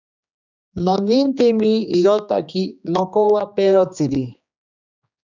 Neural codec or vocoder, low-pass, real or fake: codec, 16 kHz, 2 kbps, X-Codec, HuBERT features, trained on general audio; 7.2 kHz; fake